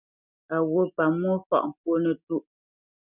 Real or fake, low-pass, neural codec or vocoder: real; 3.6 kHz; none